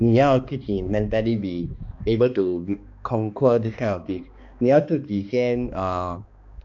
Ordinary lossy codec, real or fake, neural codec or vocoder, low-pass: none; fake; codec, 16 kHz, 2 kbps, X-Codec, HuBERT features, trained on balanced general audio; 7.2 kHz